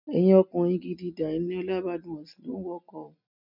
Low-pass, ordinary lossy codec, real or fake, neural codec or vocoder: 5.4 kHz; none; real; none